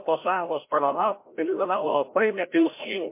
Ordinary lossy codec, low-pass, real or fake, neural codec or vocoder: MP3, 24 kbps; 3.6 kHz; fake; codec, 16 kHz, 0.5 kbps, FreqCodec, larger model